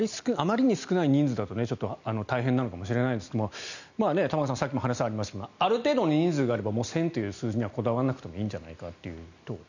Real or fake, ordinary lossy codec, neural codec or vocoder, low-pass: real; none; none; 7.2 kHz